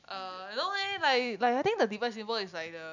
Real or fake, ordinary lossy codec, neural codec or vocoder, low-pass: real; none; none; 7.2 kHz